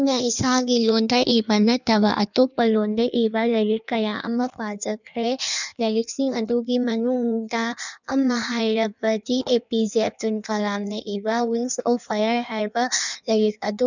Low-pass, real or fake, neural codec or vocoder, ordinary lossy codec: 7.2 kHz; fake; codec, 16 kHz in and 24 kHz out, 1.1 kbps, FireRedTTS-2 codec; none